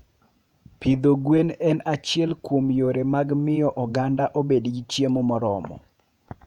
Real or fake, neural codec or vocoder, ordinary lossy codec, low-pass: fake; vocoder, 48 kHz, 128 mel bands, Vocos; none; 19.8 kHz